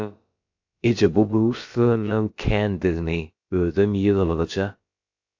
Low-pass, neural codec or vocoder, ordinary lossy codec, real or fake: 7.2 kHz; codec, 16 kHz, about 1 kbps, DyCAST, with the encoder's durations; AAC, 48 kbps; fake